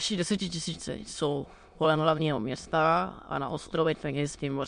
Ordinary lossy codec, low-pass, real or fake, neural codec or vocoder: MP3, 64 kbps; 9.9 kHz; fake; autoencoder, 22.05 kHz, a latent of 192 numbers a frame, VITS, trained on many speakers